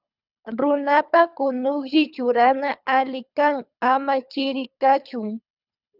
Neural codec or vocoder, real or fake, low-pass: codec, 24 kHz, 3 kbps, HILCodec; fake; 5.4 kHz